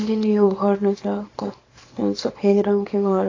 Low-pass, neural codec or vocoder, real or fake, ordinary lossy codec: 7.2 kHz; codec, 24 kHz, 0.9 kbps, WavTokenizer, small release; fake; AAC, 32 kbps